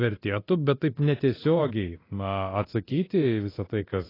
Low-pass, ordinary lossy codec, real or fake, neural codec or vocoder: 5.4 kHz; AAC, 24 kbps; fake; codec, 24 kHz, 0.9 kbps, DualCodec